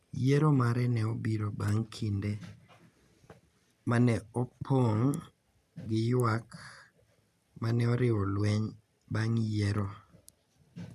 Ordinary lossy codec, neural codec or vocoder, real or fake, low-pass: none; none; real; 14.4 kHz